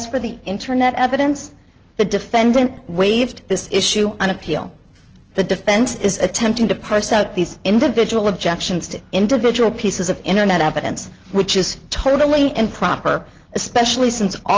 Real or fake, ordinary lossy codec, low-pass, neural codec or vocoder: real; Opus, 16 kbps; 7.2 kHz; none